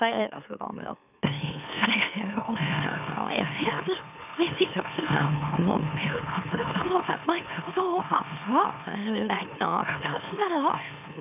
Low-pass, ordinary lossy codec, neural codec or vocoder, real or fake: 3.6 kHz; none; autoencoder, 44.1 kHz, a latent of 192 numbers a frame, MeloTTS; fake